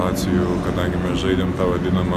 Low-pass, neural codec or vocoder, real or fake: 14.4 kHz; none; real